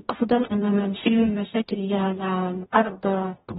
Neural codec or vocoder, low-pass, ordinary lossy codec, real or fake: codec, 44.1 kHz, 0.9 kbps, DAC; 19.8 kHz; AAC, 16 kbps; fake